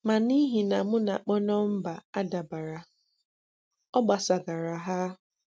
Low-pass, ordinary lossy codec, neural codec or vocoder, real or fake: none; none; none; real